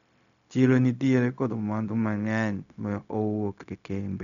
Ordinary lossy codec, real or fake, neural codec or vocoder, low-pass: none; fake; codec, 16 kHz, 0.4 kbps, LongCat-Audio-Codec; 7.2 kHz